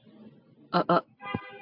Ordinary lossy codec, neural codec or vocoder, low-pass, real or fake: AAC, 32 kbps; none; 5.4 kHz; real